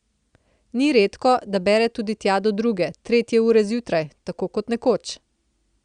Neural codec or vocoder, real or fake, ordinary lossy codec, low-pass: none; real; Opus, 64 kbps; 9.9 kHz